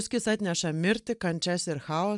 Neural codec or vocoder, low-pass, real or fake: none; 10.8 kHz; real